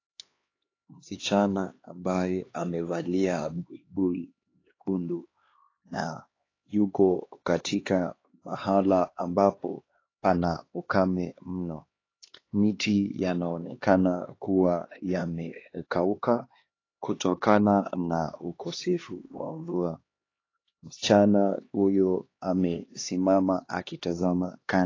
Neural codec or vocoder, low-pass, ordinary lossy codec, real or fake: codec, 16 kHz, 2 kbps, X-Codec, HuBERT features, trained on LibriSpeech; 7.2 kHz; AAC, 32 kbps; fake